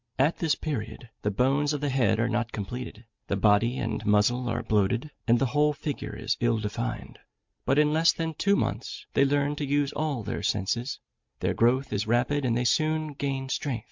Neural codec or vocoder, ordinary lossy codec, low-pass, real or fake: none; MP3, 64 kbps; 7.2 kHz; real